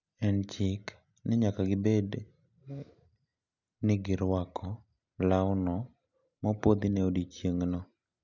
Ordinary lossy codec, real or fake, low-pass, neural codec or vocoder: none; real; 7.2 kHz; none